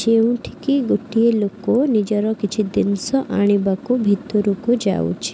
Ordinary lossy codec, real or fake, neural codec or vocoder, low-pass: none; real; none; none